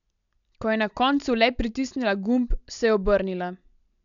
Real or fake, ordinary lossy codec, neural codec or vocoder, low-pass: real; none; none; 7.2 kHz